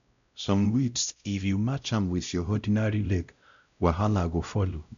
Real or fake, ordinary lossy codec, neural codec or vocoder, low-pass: fake; MP3, 96 kbps; codec, 16 kHz, 0.5 kbps, X-Codec, WavLM features, trained on Multilingual LibriSpeech; 7.2 kHz